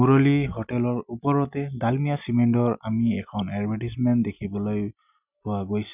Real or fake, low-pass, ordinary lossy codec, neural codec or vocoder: real; 3.6 kHz; none; none